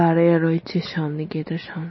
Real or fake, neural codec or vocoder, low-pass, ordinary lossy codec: real; none; 7.2 kHz; MP3, 24 kbps